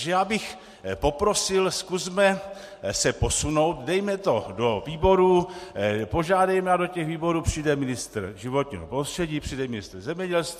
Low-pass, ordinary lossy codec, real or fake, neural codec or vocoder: 14.4 kHz; MP3, 64 kbps; real; none